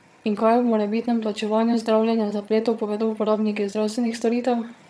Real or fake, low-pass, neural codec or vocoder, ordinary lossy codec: fake; none; vocoder, 22.05 kHz, 80 mel bands, HiFi-GAN; none